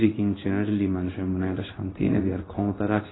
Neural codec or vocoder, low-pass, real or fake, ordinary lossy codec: codec, 16 kHz in and 24 kHz out, 1 kbps, XY-Tokenizer; 7.2 kHz; fake; AAC, 16 kbps